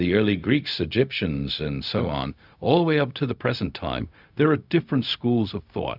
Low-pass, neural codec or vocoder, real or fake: 5.4 kHz; codec, 16 kHz, 0.4 kbps, LongCat-Audio-Codec; fake